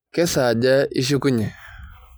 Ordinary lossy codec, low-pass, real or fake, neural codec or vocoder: none; none; real; none